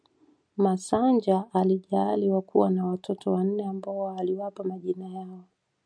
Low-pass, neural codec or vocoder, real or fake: 9.9 kHz; none; real